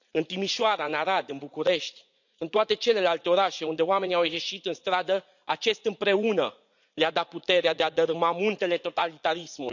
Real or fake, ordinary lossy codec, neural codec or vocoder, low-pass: fake; none; vocoder, 44.1 kHz, 80 mel bands, Vocos; 7.2 kHz